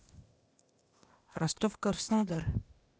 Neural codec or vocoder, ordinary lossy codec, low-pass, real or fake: codec, 16 kHz, 0.8 kbps, ZipCodec; none; none; fake